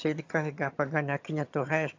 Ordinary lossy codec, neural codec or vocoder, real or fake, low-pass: AAC, 48 kbps; vocoder, 22.05 kHz, 80 mel bands, HiFi-GAN; fake; 7.2 kHz